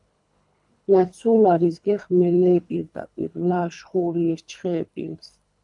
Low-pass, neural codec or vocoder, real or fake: 10.8 kHz; codec, 24 kHz, 3 kbps, HILCodec; fake